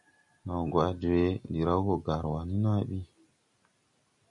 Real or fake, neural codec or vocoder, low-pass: real; none; 10.8 kHz